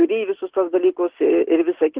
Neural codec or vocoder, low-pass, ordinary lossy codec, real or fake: none; 3.6 kHz; Opus, 16 kbps; real